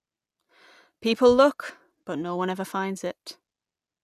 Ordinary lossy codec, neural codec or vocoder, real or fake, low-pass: none; none; real; 14.4 kHz